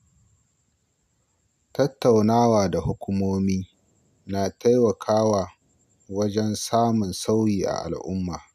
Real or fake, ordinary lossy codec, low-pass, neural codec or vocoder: real; none; 14.4 kHz; none